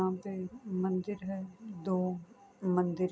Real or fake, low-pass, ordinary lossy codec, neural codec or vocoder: real; none; none; none